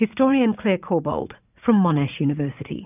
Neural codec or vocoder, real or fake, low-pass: vocoder, 44.1 kHz, 80 mel bands, Vocos; fake; 3.6 kHz